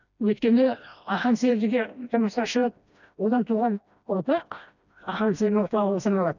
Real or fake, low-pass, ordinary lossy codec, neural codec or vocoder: fake; 7.2 kHz; none; codec, 16 kHz, 1 kbps, FreqCodec, smaller model